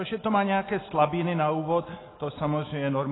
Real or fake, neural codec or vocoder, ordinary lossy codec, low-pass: real; none; AAC, 16 kbps; 7.2 kHz